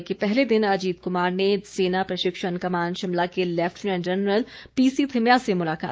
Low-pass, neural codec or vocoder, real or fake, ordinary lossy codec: none; codec, 16 kHz, 6 kbps, DAC; fake; none